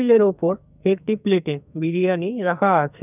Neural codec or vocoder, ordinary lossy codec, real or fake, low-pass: codec, 44.1 kHz, 2.6 kbps, SNAC; none; fake; 3.6 kHz